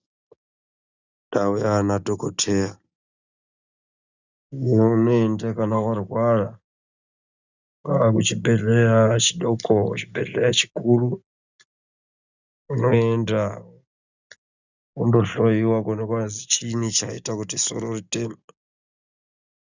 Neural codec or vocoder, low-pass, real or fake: none; 7.2 kHz; real